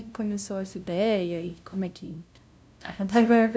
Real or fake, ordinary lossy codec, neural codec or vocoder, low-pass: fake; none; codec, 16 kHz, 0.5 kbps, FunCodec, trained on LibriTTS, 25 frames a second; none